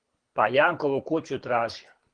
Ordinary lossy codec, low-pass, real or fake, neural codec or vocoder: Opus, 24 kbps; 9.9 kHz; fake; codec, 24 kHz, 6 kbps, HILCodec